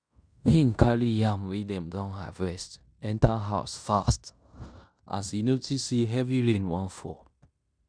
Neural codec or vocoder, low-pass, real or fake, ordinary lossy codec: codec, 16 kHz in and 24 kHz out, 0.9 kbps, LongCat-Audio-Codec, fine tuned four codebook decoder; 9.9 kHz; fake; none